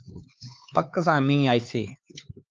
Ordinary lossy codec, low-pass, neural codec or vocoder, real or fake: Opus, 24 kbps; 7.2 kHz; codec, 16 kHz, 4 kbps, X-Codec, HuBERT features, trained on LibriSpeech; fake